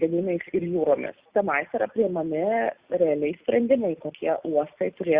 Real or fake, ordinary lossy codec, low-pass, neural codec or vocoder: real; Opus, 64 kbps; 3.6 kHz; none